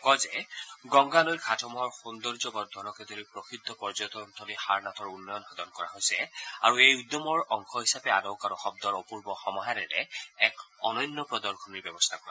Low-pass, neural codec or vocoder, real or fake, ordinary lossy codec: none; none; real; none